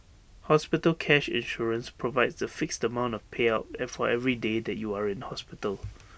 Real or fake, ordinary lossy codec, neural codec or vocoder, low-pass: real; none; none; none